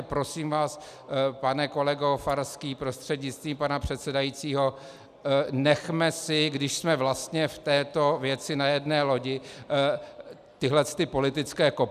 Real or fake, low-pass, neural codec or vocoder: real; 14.4 kHz; none